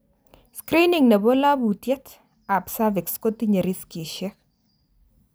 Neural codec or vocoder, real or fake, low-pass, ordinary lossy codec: none; real; none; none